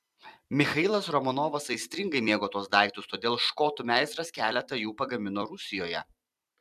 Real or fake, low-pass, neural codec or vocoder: fake; 14.4 kHz; vocoder, 44.1 kHz, 128 mel bands every 256 samples, BigVGAN v2